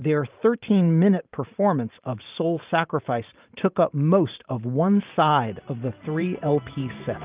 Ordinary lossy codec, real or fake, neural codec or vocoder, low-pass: Opus, 32 kbps; fake; vocoder, 44.1 kHz, 128 mel bands every 512 samples, BigVGAN v2; 3.6 kHz